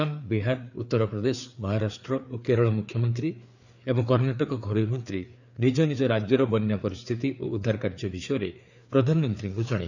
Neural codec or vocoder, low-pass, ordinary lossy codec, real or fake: codec, 16 kHz, 4 kbps, FreqCodec, larger model; 7.2 kHz; none; fake